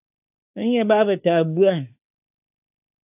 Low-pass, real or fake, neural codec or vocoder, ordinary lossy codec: 3.6 kHz; fake; autoencoder, 48 kHz, 32 numbers a frame, DAC-VAE, trained on Japanese speech; AAC, 24 kbps